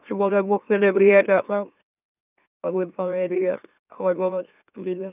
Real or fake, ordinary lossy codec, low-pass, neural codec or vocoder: fake; none; 3.6 kHz; autoencoder, 44.1 kHz, a latent of 192 numbers a frame, MeloTTS